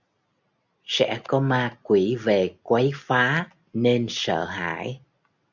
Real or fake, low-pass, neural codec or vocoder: real; 7.2 kHz; none